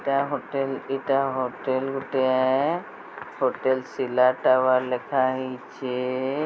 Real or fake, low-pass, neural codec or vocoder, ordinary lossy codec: real; none; none; none